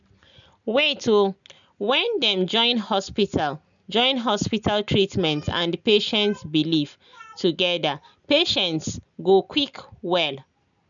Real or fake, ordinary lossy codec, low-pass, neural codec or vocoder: real; none; 7.2 kHz; none